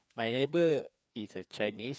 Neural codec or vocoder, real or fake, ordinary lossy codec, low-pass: codec, 16 kHz, 2 kbps, FreqCodec, larger model; fake; none; none